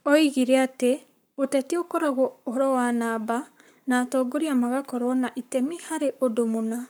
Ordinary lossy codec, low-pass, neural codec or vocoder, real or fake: none; none; codec, 44.1 kHz, 7.8 kbps, Pupu-Codec; fake